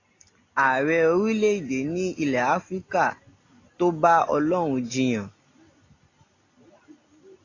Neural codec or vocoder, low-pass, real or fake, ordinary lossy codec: none; 7.2 kHz; real; AAC, 32 kbps